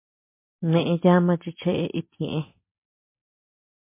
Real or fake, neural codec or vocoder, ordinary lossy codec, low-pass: real; none; MP3, 24 kbps; 3.6 kHz